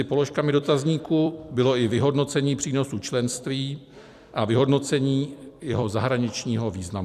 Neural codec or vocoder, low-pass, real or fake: vocoder, 44.1 kHz, 128 mel bands every 256 samples, BigVGAN v2; 14.4 kHz; fake